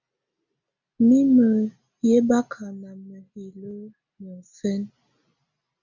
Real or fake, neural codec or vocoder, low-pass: real; none; 7.2 kHz